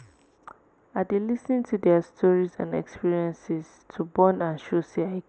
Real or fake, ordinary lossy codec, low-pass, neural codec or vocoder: real; none; none; none